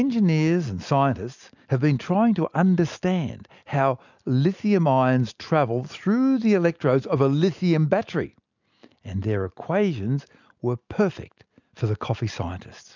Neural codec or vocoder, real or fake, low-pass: none; real; 7.2 kHz